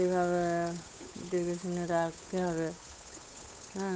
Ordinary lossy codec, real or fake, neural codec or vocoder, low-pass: none; fake; codec, 16 kHz, 8 kbps, FunCodec, trained on Chinese and English, 25 frames a second; none